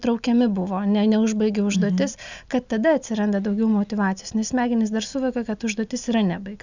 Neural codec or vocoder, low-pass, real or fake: none; 7.2 kHz; real